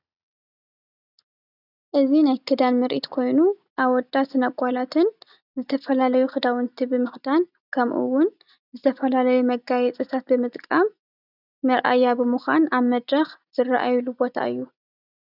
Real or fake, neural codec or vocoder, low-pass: real; none; 5.4 kHz